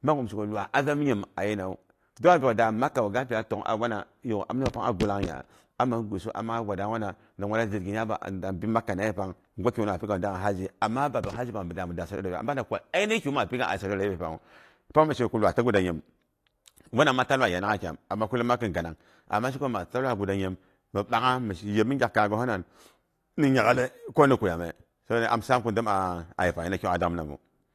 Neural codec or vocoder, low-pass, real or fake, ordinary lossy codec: none; 14.4 kHz; real; AAC, 48 kbps